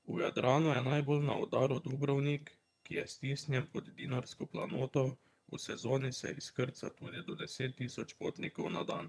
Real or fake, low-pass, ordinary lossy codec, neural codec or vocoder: fake; none; none; vocoder, 22.05 kHz, 80 mel bands, HiFi-GAN